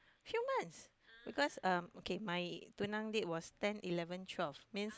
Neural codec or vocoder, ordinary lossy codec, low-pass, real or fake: none; none; none; real